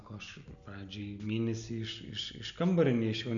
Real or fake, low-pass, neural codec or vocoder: real; 7.2 kHz; none